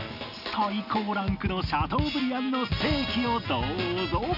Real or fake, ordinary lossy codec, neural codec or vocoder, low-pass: real; none; none; 5.4 kHz